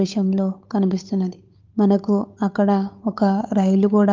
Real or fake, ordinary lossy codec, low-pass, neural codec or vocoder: fake; Opus, 24 kbps; 7.2 kHz; codec, 16 kHz, 8 kbps, FunCodec, trained on Chinese and English, 25 frames a second